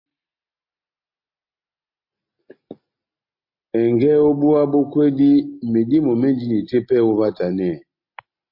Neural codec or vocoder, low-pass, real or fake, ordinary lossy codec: none; 5.4 kHz; real; MP3, 32 kbps